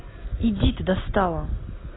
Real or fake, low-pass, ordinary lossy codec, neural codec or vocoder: real; 7.2 kHz; AAC, 16 kbps; none